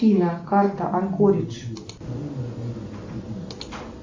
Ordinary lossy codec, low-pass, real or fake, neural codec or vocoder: MP3, 48 kbps; 7.2 kHz; real; none